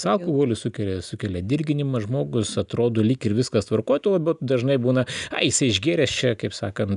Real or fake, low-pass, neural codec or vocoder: real; 10.8 kHz; none